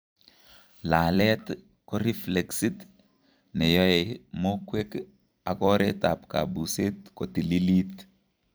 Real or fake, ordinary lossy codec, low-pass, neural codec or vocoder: fake; none; none; vocoder, 44.1 kHz, 128 mel bands every 256 samples, BigVGAN v2